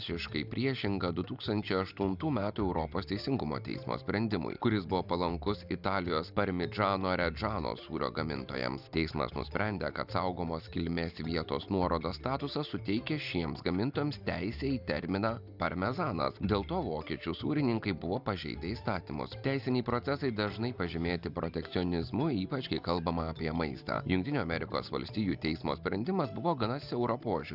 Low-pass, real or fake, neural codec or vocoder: 5.4 kHz; real; none